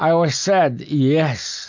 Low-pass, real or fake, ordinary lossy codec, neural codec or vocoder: 7.2 kHz; real; MP3, 64 kbps; none